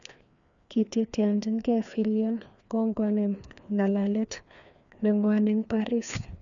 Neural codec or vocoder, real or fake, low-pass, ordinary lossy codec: codec, 16 kHz, 2 kbps, FreqCodec, larger model; fake; 7.2 kHz; none